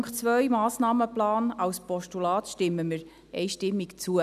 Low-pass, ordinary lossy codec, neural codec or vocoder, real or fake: 14.4 kHz; none; none; real